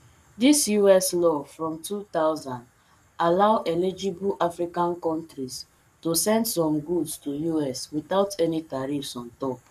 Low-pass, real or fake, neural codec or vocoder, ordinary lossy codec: 14.4 kHz; fake; codec, 44.1 kHz, 7.8 kbps, Pupu-Codec; none